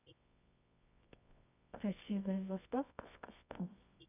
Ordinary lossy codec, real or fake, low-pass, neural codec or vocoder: none; fake; 3.6 kHz; codec, 24 kHz, 0.9 kbps, WavTokenizer, medium music audio release